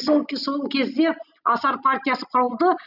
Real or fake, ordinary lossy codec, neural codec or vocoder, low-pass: real; none; none; 5.4 kHz